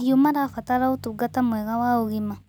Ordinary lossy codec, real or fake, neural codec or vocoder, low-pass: none; real; none; 19.8 kHz